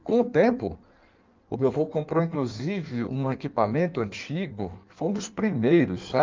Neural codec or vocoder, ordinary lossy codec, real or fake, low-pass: codec, 16 kHz in and 24 kHz out, 1.1 kbps, FireRedTTS-2 codec; Opus, 24 kbps; fake; 7.2 kHz